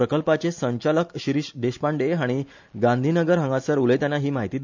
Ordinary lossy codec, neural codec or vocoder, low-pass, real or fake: MP3, 64 kbps; none; 7.2 kHz; real